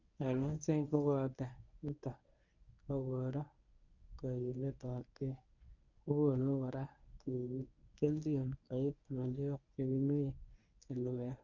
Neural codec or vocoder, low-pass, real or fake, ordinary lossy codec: codec, 24 kHz, 0.9 kbps, WavTokenizer, medium speech release version 1; 7.2 kHz; fake; none